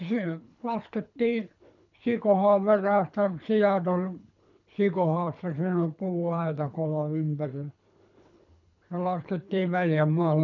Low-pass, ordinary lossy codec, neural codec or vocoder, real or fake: 7.2 kHz; none; codec, 24 kHz, 3 kbps, HILCodec; fake